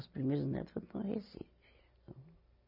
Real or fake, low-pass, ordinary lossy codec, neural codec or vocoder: real; 5.4 kHz; none; none